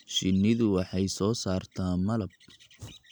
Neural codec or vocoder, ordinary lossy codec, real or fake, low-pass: none; none; real; none